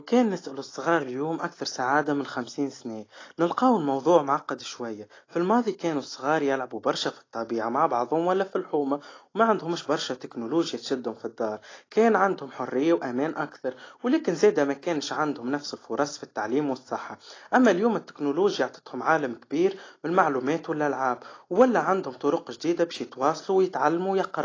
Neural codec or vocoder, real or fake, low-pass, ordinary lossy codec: none; real; 7.2 kHz; AAC, 32 kbps